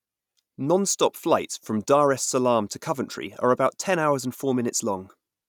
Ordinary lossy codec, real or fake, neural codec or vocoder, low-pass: none; real; none; 19.8 kHz